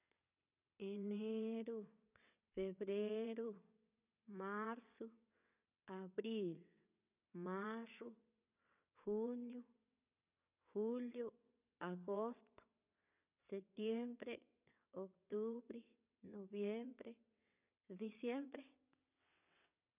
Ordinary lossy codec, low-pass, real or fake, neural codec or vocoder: none; 3.6 kHz; fake; vocoder, 22.05 kHz, 80 mel bands, WaveNeXt